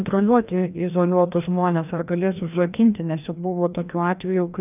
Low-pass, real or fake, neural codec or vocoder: 3.6 kHz; fake; codec, 16 kHz, 1 kbps, FreqCodec, larger model